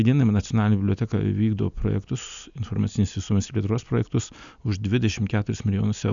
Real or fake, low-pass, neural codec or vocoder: real; 7.2 kHz; none